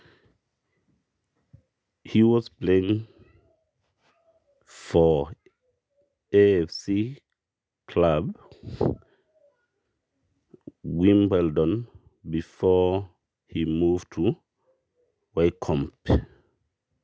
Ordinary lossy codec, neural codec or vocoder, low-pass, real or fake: none; none; none; real